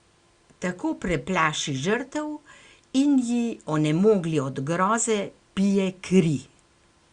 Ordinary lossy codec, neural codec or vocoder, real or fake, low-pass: Opus, 64 kbps; none; real; 9.9 kHz